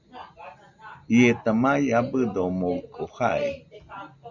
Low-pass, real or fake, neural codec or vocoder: 7.2 kHz; real; none